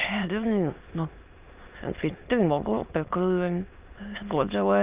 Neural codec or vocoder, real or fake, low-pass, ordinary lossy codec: autoencoder, 22.05 kHz, a latent of 192 numbers a frame, VITS, trained on many speakers; fake; 3.6 kHz; Opus, 24 kbps